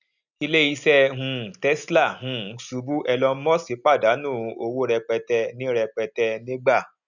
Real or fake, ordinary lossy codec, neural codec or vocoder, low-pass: real; none; none; 7.2 kHz